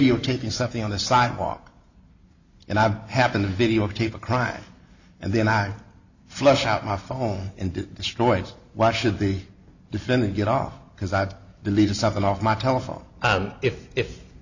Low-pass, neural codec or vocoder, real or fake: 7.2 kHz; none; real